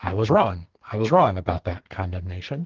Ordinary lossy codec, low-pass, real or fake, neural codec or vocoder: Opus, 16 kbps; 7.2 kHz; fake; codec, 44.1 kHz, 2.6 kbps, SNAC